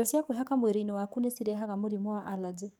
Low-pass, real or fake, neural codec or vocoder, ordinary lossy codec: 19.8 kHz; fake; codec, 44.1 kHz, 7.8 kbps, DAC; none